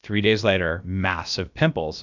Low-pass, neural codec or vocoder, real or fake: 7.2 kHz; codec, 16 kHz, about 1 kbps, DyCAST, with the encoder's durations; fake